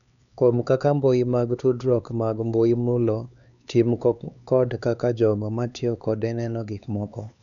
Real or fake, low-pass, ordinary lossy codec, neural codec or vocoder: fake; 7.2 kHz; none; codec, 16 kHz, 4 kbps, X-Codec, HuBERT features, trained on LibriSpeech